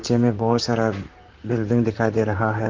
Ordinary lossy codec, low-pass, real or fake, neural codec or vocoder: Opus, 32 kbps; 7.2 kHz; fake; vocoder, 44.1 kHz, 128 mel bands, Pupu-Vocoder